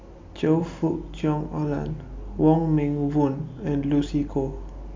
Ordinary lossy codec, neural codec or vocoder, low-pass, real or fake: MP3, 64 kbps; none; 7.2 kHz; real